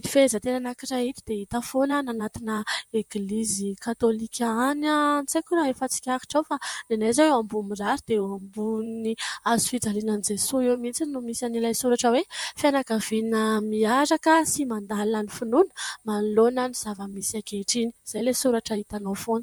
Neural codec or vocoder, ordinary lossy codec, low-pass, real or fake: vocoder, 44.1 kHz, 128 mel bands, Pupu-Vocoder; MP3, 96 kbps; 19.8 kHz; fake